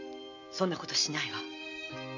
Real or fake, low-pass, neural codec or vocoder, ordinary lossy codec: real; 7.2 kHz; none; none